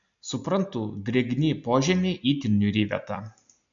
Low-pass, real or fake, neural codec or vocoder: 7.2 kHz; real; none